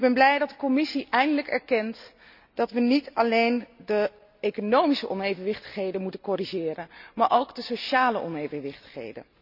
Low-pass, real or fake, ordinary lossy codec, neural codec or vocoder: 5.4 kHz; real; none; none